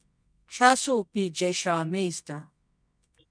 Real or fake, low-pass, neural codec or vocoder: fake; 9.9 kHz; codec, 24 kHz, 0.9 kbps, WavTokenizer, medium music audio release